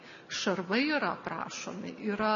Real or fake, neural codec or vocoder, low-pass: real; none; 7.2 kHz